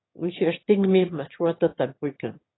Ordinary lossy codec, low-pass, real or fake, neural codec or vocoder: AAC, 16 kbps; 7.2 kHz; fake; autoencoder, 22.05 kHz, a latent of 192 numbers a frame, VITS, trained on one speaker